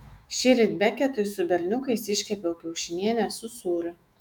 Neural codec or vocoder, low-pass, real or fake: autoencoder, 48 kHz, 128 numbers a frame, DAC-VAE, trained on Japanese speech; 19.8 kHz; fake